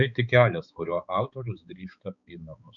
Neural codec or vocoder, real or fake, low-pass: codec, 16 kHz, 4 kbps, X-Codec, HuBERT features, trained on balanced general audio; fake; 7.2 kHz